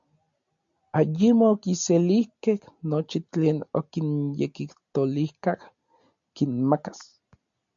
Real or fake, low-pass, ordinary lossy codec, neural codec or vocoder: real; 7.2 kHz; MP3, 64 kbps; none